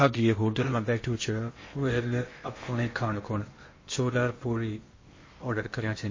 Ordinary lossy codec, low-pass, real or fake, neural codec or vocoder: MP3, 32 kbps; 7.2 kHz; fake; codec, 16 kHz in and 24 kHz out, 0.8 kbps, FocalCodec, streaming, 65536 codes